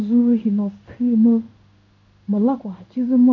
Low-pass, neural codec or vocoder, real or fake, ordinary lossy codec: 7.2 kHz; codec, 16 kHz in and 24 kHz out, 1 kbps, XY-Tokenizer; fake; none